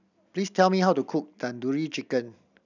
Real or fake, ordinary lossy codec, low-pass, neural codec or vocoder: real; none; 7.2 kHz; none